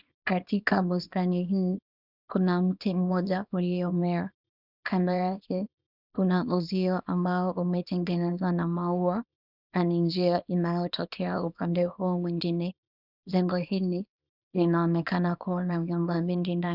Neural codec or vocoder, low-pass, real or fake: codec, 24 kHz, 0.9 kbps, WavTokenizer, small release; 5.4 kHz; fake